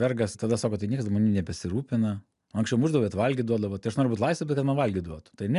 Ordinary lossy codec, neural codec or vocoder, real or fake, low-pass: MP3, 96 kbps; none; real; 10.8 kHz